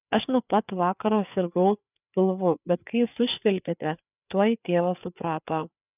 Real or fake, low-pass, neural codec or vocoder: fake; 3.6 kHz; codec, 16 kHz, 4 kbps, FreqCodec, larger model